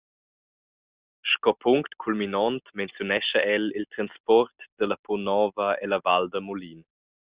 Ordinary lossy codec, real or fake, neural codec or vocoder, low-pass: Opus, 64 kbps; real; none; 3.6 kHz